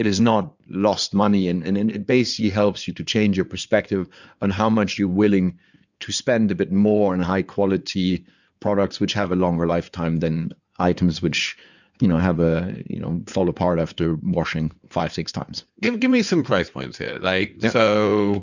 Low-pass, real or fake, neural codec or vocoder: 7.2 kHz; fake; codec, 16 kHz in and 24 kHz out, 2.2 kbps, FireRedTTS-2 codec